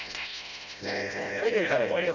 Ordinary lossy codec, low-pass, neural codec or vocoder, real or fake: none; 7.2 kHz; codec, 16 kHz, 0.5 kbps, FreqCodec, smaller model; fake